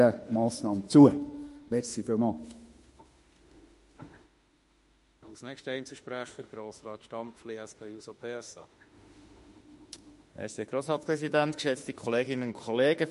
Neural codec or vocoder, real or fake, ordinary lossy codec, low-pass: autoencoder, 48 kHz, 32 numbers a frame, DAC-VAE, trained on Japanese speech; fake; MP3, 48 kbps; 14.4 kHz